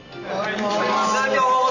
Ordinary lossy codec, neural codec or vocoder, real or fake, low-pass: none; none; real; 7.2 kHz